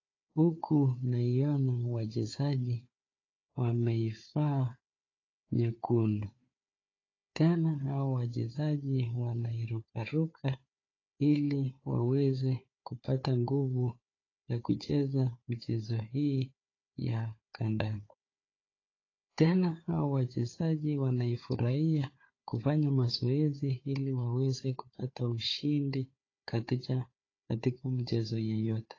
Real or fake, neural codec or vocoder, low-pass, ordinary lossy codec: fake; codec, 16 kHz, 4 kbps, FunCodec, trained on Chinese and English, 50 frames a second; 7.2 kHz; AAC, 32 kbps